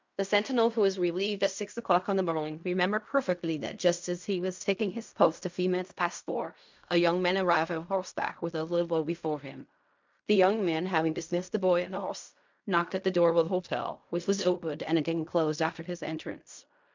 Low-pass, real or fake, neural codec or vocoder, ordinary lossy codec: 7.2 kHz; fake; codec, 16 kHz in and 24 kHz out, 0.4 kbps, LongCat-Audio-Codec, fine tuned four codebook decoder; MP3, 64 kbps